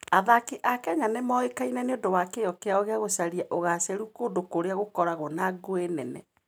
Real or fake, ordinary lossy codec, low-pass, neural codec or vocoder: real; none; none; none